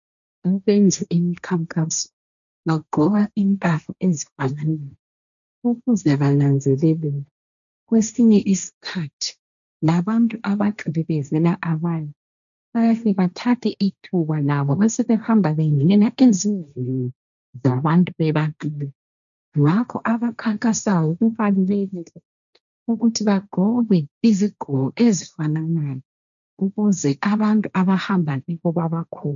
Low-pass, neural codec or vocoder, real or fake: 7.2 kHz; codec, 16 kHz, 1.1 kbps, Voila-Tokenizer; fake